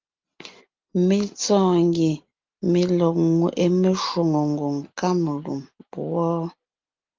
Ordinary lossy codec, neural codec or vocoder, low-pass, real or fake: Opus, 24 kbps; none; 7.2 kHz; real